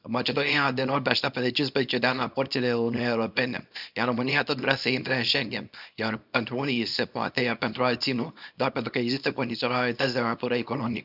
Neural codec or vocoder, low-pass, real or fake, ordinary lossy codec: codec, 24 kHz, 0.9 kbps, WavTokenizer, small release; 5.4 kHz; fake; none